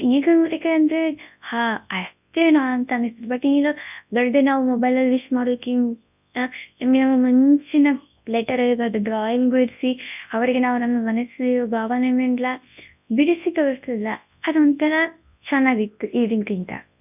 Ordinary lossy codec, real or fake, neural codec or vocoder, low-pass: none; fake; codec, 24 kHz, 0.9 kbps, WavTokenizer, large speech release; 3.6 kHz